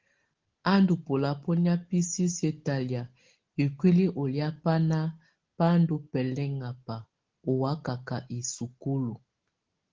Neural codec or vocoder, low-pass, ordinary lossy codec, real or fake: none; 7.2 kHz; Opus, 16 kbps; real